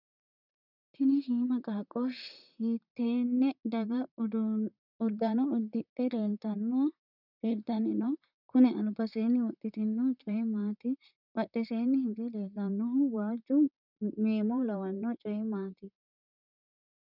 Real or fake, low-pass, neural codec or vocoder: fake; 5.4 kHz; vocoder, 44.1 kHz, 128 mel bands, Pupu-Vocoder